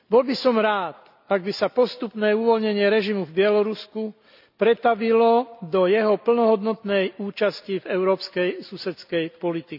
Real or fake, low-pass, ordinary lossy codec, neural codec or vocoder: real; 5.4 kHz; none; none